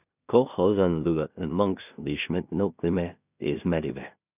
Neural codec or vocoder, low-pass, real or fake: codec, 16 kHz in and 24 kHz out, 0.4 kbps, LongCat-Audio-Codec, two codebook decoder; 3.6 kHz; fake